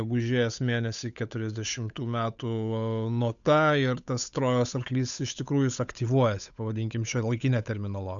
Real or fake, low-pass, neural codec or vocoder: fake; 7.2 kHz; codec, 16 kHz, 8 kbps, FunCodec, trained on Chinese and English, 25 frames a second